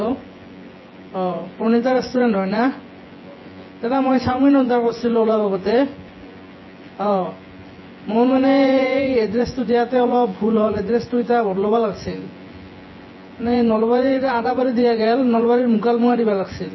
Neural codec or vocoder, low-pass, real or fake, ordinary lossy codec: vocoder, 24 kHz, 100 mel bands, Vocos; 7.2 kHz; fake; MP3, 24 kbps